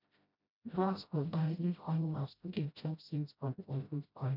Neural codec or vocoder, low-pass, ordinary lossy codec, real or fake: codec, 16 kHz, 0.5 kbps, FreqCodec, smaller model; 5.4 kHz; none; fake